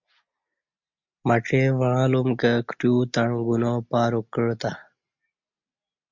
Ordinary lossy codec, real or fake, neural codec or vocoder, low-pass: MP3, 64 kbps; real; none; 7.2 kHz